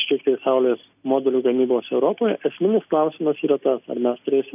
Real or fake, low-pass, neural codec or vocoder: real; 3.6 kHz; none